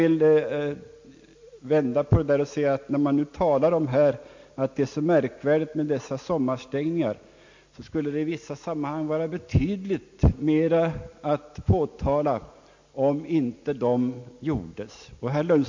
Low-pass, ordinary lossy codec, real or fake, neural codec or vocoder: 7.2 kHz; MP3, 48 kbps; real; none